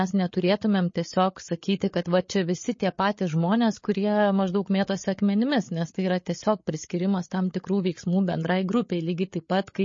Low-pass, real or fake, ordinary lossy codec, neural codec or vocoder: 7.2 kHz; fake; MP3, 32 kbps; codec, 16 kHz, 8 kbps, FreqCodec, larger model